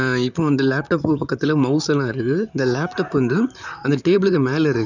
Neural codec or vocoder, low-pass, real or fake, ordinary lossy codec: vocoder, 44.1 kHz, 128 mel bands, Pupu-Vocoder; 7.2 kHz; fake; none